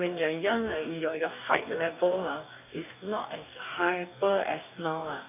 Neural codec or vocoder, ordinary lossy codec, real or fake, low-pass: codec, 44.1 kHz, 2.6 kbps, DAC; none; fake; 3.6 kHz